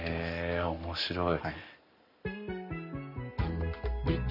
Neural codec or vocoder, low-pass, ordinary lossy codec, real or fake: none; 5.4 kHz; none; real